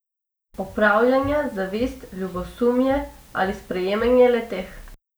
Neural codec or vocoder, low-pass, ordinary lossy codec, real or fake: none; none; none; real